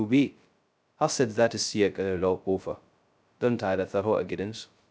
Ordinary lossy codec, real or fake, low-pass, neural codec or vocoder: none; fake; none; codec, 16 kHz, 0.2 kbps, FocalCodec